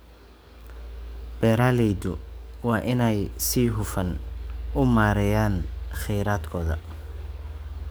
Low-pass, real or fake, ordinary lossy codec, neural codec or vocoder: none; fake; none; codec, 44.1 kHz, 7.8 kbps, DAC